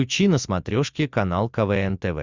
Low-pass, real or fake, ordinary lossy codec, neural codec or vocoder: 7.2 kHz; real; Opus, 64 kbps; none